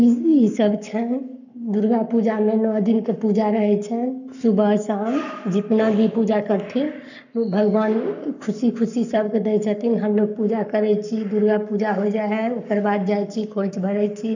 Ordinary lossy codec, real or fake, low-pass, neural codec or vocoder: none; fake; 7.2 kHz; codec, 44.1 kHz, 7.8 kbps, Pupu-Codec